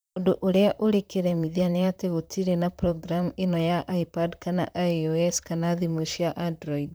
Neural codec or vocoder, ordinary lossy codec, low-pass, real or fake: vocoder, 44.1 kHz, 128 mel bands, Pupu-Vocoder; none; none; fake